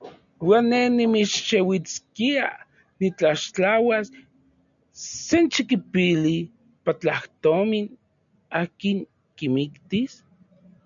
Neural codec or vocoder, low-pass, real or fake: none; 7.2 kHz; real